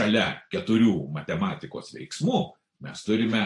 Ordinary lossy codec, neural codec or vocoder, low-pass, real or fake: AAC, 64 kbps; vocoder, 44.1 kHz, 128 mel bands every 512 samples, BigVGAN v2; 10.8 kHz; fake